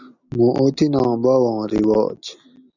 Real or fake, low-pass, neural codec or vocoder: real; 7.2 kHz; none